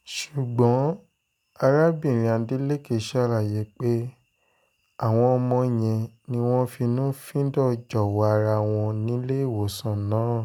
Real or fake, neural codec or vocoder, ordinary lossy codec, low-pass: real; none; none; 19.8 kHz